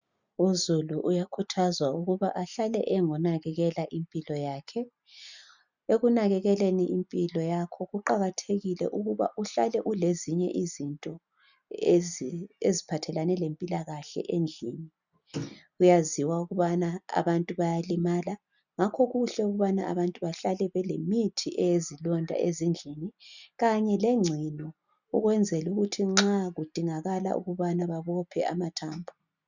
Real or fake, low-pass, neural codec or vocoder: real; 7.2 kHz; none